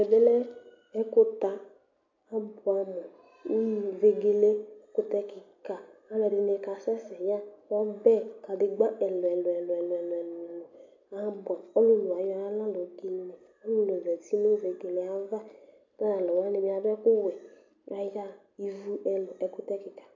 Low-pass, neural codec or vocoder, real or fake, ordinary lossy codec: 7.2 kHz; none; real; MP3, 48 kbps